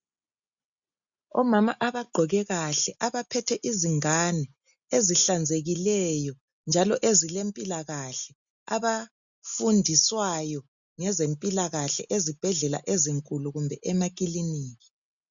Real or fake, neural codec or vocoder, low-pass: real; none; 7.2 kHz